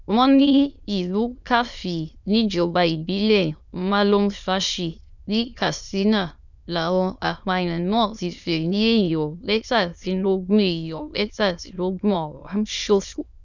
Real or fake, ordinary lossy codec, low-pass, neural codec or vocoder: fake; none; 7.2 kHz; autoencoder, 22.05 kHz, a latent of 192 numbers a frame, VITS, trained on many speakers